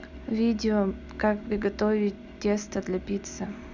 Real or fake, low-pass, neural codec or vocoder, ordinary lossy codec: real; 7.2 kHz; none; none